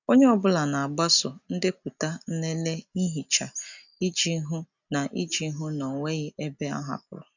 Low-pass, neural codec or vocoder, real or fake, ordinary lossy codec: 7.2 kHz; none; real; none